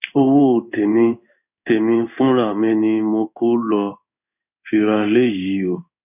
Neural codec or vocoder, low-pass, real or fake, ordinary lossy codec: codec, 16 kHz in and 24 kHz out, 1 kbps, XY-Tokenizer; 3.6 kHz; fake; none